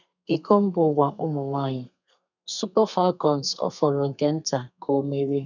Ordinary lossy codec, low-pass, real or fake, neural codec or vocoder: none; 7.2 kHz; fake; codec, 32 kHz, 1.9 kbps, SNAC